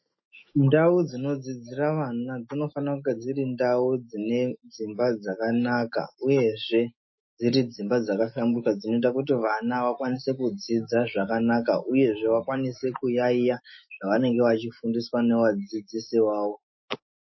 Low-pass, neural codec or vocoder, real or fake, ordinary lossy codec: 7.2 kHz; autoencoder, 48 kHz, 128 numbers a frame, DAC-VAE, trained on Japanese speech; fake; MP3, 24 kbps